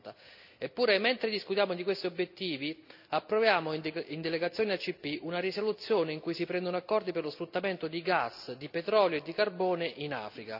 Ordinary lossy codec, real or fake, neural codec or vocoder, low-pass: none; real; none; 5.4 kHz